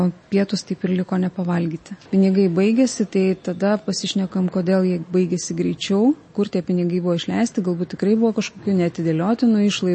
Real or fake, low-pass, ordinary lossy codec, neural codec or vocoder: real; 9.9 kHz; MP3, 32 kbps; none